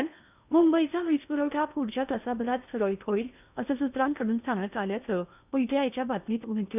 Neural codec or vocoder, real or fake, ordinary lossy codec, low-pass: codec, 16 kHz in and 24 kHz out, 0.8 kbps, FocalCodec, streaming, 65536 codes; fake; none; 3.6 kHz